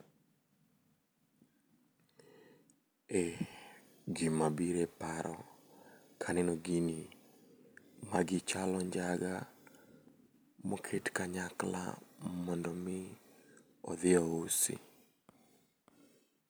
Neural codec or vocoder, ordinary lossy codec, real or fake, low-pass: none; none; real; none